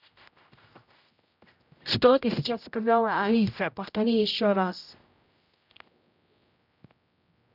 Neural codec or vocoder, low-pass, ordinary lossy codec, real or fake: codec, 16 kHz, 0.5 kbps, X-Codec, HuBERT features, trained on general audio; 5.4 kHz; none; fake